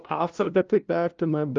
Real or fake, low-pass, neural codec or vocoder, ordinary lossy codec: fake; 7.2 kHz; codec, 16 kHz, 0.5 kbps, FunCodec, trained on LibriTTS, 25 frames a second; Opus, 24 kbps